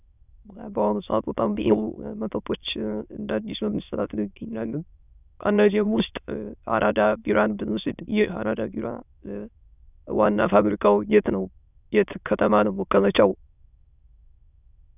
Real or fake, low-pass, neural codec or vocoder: fake; 3.6 kHz; autoencoder, 22.05 kHz, a latent of 192 numbers a frame, VITS, trained on many speakers